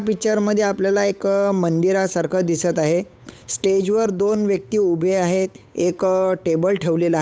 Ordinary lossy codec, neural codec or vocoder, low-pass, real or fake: none; codec, 16 kHz, 8 kbps, FunCodec, trained on Chinese and English, 25 frames a second; none; fake